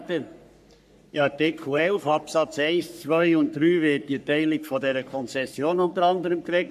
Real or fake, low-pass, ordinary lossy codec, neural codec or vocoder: fake; 14.4 kHz; AAC, 96 kbps; codec, 44.1 kHz, 3.4 kbps, Pupu-Codec